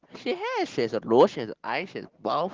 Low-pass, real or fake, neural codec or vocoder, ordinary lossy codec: 7.2 kHz; real; none; Opus, 16 kbps